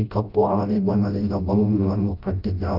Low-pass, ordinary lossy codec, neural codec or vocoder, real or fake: 5.4 kHz; Opus, 32 kbps; codec, 16 kHz, 0.5 kbps, FreqCodec, smaller model; fake